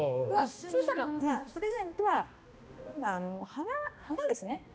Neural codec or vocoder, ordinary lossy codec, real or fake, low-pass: codec, 16 kHz, 1 kbps, X-Codec, HuBERT features, trained on balanced general audio; none; fake; none